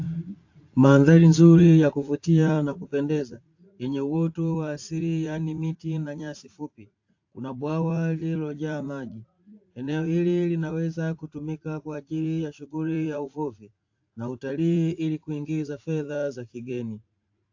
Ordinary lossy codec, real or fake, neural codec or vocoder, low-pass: AAC, 48 kbps; fake; vocoder, 44.1 kHz, 80 mel bands, Vocos; 7.2 kHz